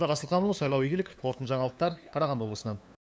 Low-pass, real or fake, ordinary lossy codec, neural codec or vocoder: none; fake; none; codec, 16 kHz, 2 kbps, FunCodec, trained on LibriTTS, 25 frames a second